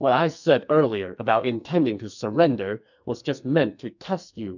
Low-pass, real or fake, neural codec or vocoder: 7.2 kHz; fake; codec, 44.1 kHz, 2.6 kbps, SNAC